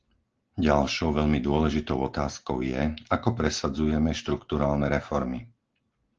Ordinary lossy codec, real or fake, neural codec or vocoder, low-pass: Opus, 16 kbps; real; none; 7.2 kHz